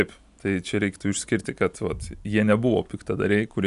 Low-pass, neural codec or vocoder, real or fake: 10.8 kHz; none; real